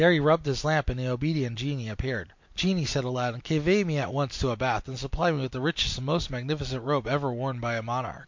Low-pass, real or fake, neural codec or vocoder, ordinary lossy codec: 7.2 kHz; real; none; MP3, 48 kbps